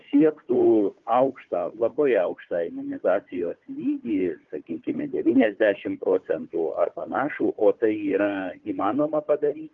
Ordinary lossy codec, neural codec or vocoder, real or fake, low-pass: Opus, 32 kbps; codec, 16 kHz, 4 kbps, FunCodec, trained on Chinese and English, 50 frames a second; fake; 7.2 kHz